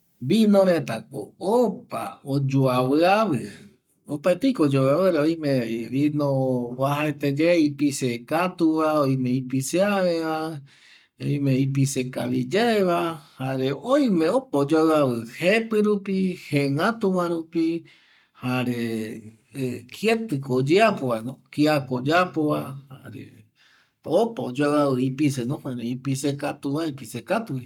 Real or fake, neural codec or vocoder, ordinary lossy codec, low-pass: fake; codec, 44.1 kHz, 7.8 kbps, Pupu-Codec; none; 19.8 kHz